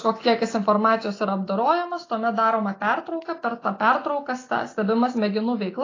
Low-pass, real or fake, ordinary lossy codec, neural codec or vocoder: 7.2 kHz; real; AAC, 32 kbps; none